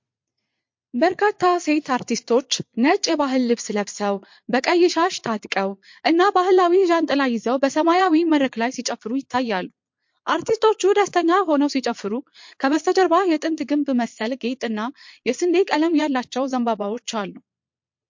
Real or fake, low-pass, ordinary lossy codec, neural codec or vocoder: fake; 7.2 kHz; MP3, 48 kbps; vocoder, 22.05 kHz, 80 mel bands, Vocos